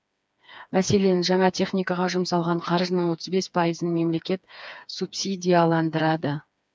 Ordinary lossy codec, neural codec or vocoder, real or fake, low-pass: none; codec, 16 kHz, 4 kbps, FreqCodec, smaller model; fake; none